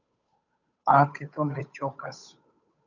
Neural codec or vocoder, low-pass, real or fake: codec, 16 kHz, 8 kbps, FunCodec, trained on Chinese and English, 25 frames a second; 7.2 kHz; fake